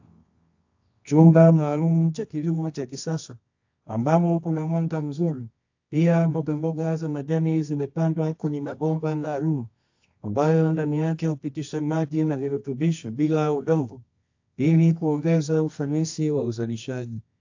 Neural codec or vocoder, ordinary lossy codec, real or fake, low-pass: codec, 24 kHz, 0.9 kbps, WavTokenizer, medium music audio release; AAC, 48 kbps; fake; 7.2 kHz